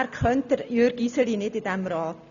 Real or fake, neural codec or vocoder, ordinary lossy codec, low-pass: real; none; none; 7.2 kHz